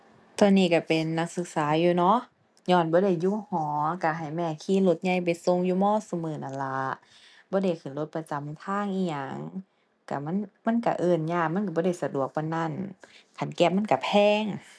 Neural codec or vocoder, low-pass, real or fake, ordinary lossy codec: none; none; real; none